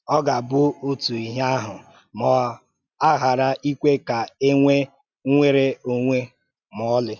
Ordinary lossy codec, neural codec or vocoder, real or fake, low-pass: none; none; real; 7.2 kHz